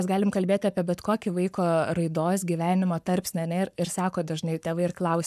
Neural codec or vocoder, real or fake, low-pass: codec, 44.1 kHz, 7.8 kbps, Pupu-Codec; fake; 14.4 kHz